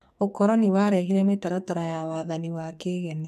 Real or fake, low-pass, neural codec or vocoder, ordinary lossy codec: fake; 14.4 kHz; codec, 44.1 kHz, 2.6 kbps, SNAC; MP3, 96 kbps